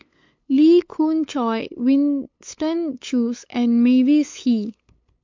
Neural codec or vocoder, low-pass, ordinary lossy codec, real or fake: codec, 16 kHz, 8 kbps, FunCodec, trained on LibriTTS, 25 frames a second; 7.2 kHz; MP3, 48 kbps; fake